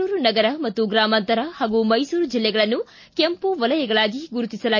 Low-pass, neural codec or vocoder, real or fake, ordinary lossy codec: 7.2 kHz; none; real; MP3, 32 kbps